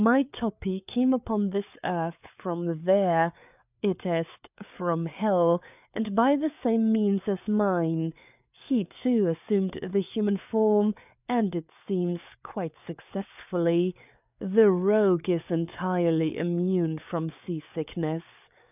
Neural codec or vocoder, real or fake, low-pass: codec, 16 kHz, 4 kbps, FreqCodec, larger model; fake; 3.6 kHz